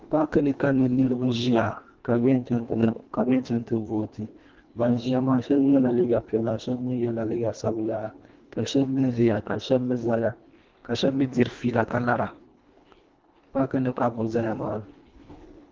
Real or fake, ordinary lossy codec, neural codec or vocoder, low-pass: fake; Opus, 32 kbps; codec, 24 kHz, 1.5 kbps, HILCodec; 7.2 kHz